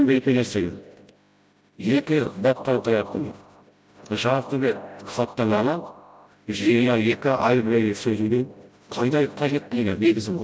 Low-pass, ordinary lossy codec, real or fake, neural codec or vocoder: none; none; fake; codec, 16 kHz, 0.5 kbps, FreqCodec, smaller model